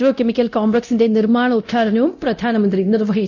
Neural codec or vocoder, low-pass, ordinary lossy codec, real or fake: codec, 24 kHz, 0.9 kbps, DualCodec; 7.2 kHz; none; fake